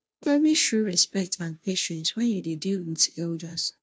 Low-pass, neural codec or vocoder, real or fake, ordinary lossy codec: none; codec, 16 kHz, 0.5 kbps, FunCodec, trained on Chinese and English, 25 frames a second; fake; none